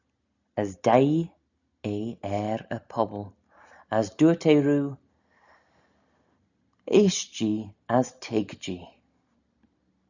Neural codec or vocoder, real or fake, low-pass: none; real; 7.2 kHz